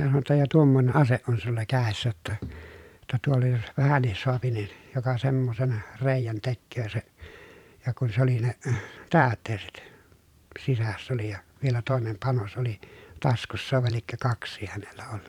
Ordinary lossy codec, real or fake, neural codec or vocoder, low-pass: none; fake; vocoder, 44.1 kHz, 128 mel bands every 512 samples, BigVGAN v2; 19.8 kHz